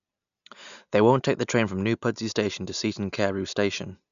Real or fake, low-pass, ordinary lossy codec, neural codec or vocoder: real; 7.2 kHz; none; none